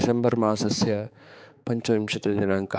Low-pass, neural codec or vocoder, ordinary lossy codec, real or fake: none; codec, 16 kHz, 4 kbps, X-Codec, HuBERT features, trained on balanced general audio; none; fake